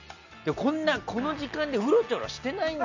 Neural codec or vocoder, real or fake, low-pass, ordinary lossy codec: none; real; 7.2 kHz; none